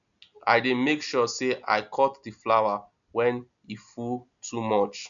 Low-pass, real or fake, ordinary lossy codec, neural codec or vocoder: 7.2 kHz; real; none; none